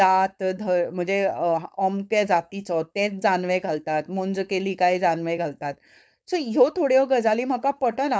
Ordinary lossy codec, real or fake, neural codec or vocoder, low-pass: none; fake; codec, 16 kHz, 4.8 kbps, FACodec; none